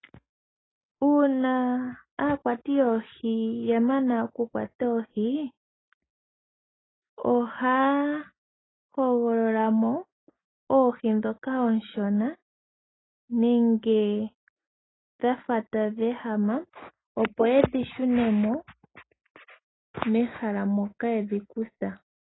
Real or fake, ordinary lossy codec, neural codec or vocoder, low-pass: real; AAC, 16 kbps; none; 7.2 kHz